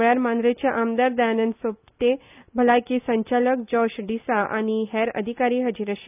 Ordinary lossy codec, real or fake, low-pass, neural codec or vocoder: none; real; 3.6 kHz; none